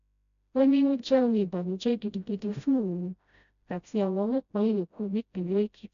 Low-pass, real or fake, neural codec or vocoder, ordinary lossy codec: 7.2 kHz; fake; codec, 16 kHz, 0.5 kbps, FreqCodec, smaller model; none